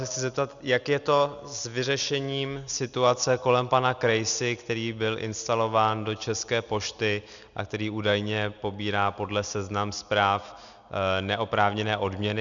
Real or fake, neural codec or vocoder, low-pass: real; none; 7.2 kHz